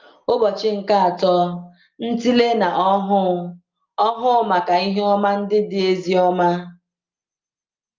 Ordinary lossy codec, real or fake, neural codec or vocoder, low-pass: Opus, 24 kbps; real; none; 7.2 kHz